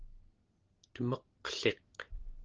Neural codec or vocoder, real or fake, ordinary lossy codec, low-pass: none; real; Opus, 16 kbps; 7.2 kHz